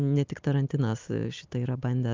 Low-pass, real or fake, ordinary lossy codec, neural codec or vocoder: 7.2 kHz; real; Opus, 24 kbps; none